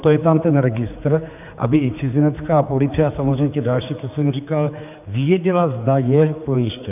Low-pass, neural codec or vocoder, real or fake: 3.6 kHz; codec, 16 kHz, 4 kbps, X-Codec, HuBERT features, trained on general audio; fake